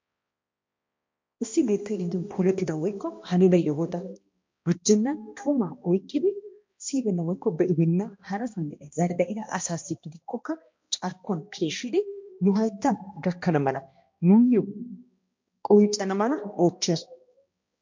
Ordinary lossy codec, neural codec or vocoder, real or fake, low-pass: MP3, 48 kbps; codec, 16 kHz, 1 kbps, X-Codec, HuBERT features, trained on balanced general audio; fake; 7.2 kHz